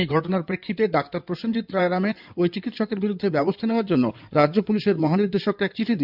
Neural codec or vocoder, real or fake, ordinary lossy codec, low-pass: codec, 16 kHz in and 24 kHz out, 2.2 kbps, FireRedTTS-2 codec; fake; none; 5.4 kHz